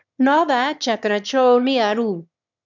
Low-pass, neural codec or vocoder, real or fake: 7.2 kHz; autoencoder, 22.05 kHz, a latent of 192 numbers a frame, VITS, trained on one speaker; fake